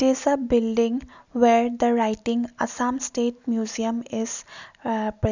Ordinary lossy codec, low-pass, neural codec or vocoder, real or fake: none; 7.2 kHz; none; real